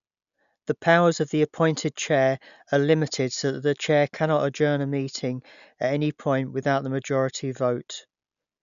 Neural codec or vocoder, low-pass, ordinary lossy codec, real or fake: none; 7.2 kHz; none; real